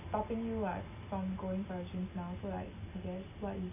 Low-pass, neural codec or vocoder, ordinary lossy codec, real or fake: 3.6 kHz; none; MP3, 24 kbps; real